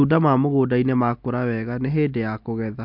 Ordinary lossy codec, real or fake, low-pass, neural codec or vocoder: MP3, 48 kbps; real; 5.4 kHz; none